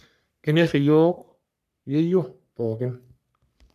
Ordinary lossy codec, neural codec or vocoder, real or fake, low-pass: none; codec, 44.1 kHz, 3.4 kbps, Pupu-Codec; fake; 14.4 kHz